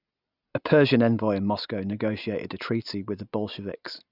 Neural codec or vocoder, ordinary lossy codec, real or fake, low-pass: none; none; real; 5.4 kHz